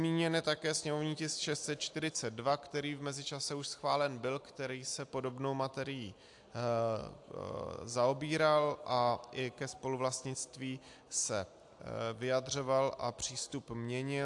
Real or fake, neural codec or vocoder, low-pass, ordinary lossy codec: real; none; 10.8 kHz; AAC, 64 kbps